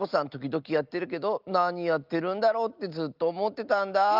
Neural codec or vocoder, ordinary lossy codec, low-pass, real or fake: none; Opus, 24 kbps; 5.4 kHz; real